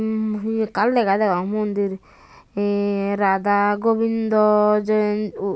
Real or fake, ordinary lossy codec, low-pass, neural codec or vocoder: real; none; none; none